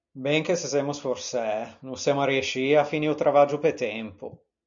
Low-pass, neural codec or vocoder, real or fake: 7.2 kHz; none; real